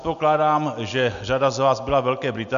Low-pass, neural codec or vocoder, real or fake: 7.2 kHz; none; real